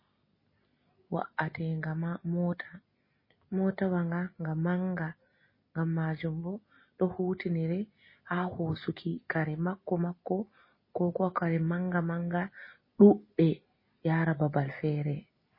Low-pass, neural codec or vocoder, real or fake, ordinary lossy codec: 5.4 kHz; none; real; MP3, 24 kbps